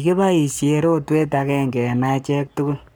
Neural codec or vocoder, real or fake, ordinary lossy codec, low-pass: codec, 44.1 kHz, 7.8 kbps, Pupu-Codec; fake; none; none